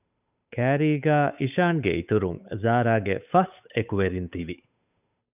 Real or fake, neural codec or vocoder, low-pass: fake; codec, 16 kHz, 8 kbps, FunCodec, trained on Chinese and English, 25 frames a second; 3.6 kHz